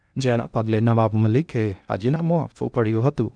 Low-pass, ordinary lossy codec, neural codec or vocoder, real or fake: 9.9 kHz; none; codec, 16 kHz in and 24 kHz out, 0.8 kbps, FocalCodec, streaming, 65536 codes; fake